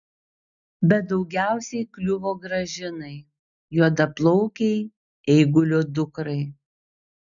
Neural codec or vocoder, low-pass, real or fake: none; 7.2 kHz; real